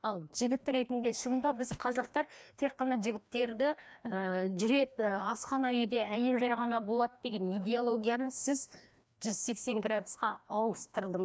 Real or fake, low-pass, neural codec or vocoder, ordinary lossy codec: fake; none; codec, 16 kHz, 1 kbps, FreqCodec, larger model; none